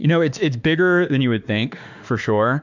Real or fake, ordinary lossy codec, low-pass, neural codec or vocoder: fake; MP3, 64 kbps; 7.2 kHz; autoencoder, 48 kHz, 32 numbers a frame, DAC-VAE, trained on Japanese speech